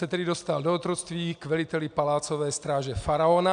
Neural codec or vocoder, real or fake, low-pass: none; real; 9.9 kHz